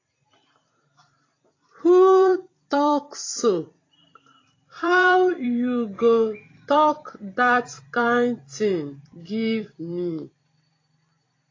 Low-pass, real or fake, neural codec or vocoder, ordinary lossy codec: 7.2 kHz; fake; vocoder, 44.1 kHz, 128 mel bands every 256 samples, BigVGAN v2; AAC, 32 kbps